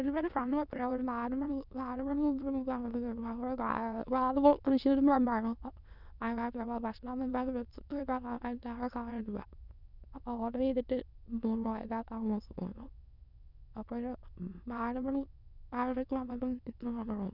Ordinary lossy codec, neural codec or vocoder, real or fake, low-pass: none; autoencoder, 22.05 kHz, a latent of 192 numbers a frame, VITS, trained on many speakers; fake; 5.4 kHz